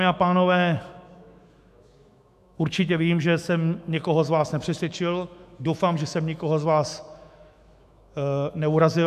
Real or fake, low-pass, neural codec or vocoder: fake; 14.4 kHz; autoencoder, 48 kHz, 128 numbers a frame, DAC-VAE, trained on Japanese speech